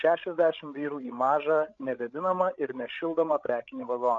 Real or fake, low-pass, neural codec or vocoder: fake; 7.2 kHz; codec, 16 kHz, 16 kbps, FreqCodec, larger model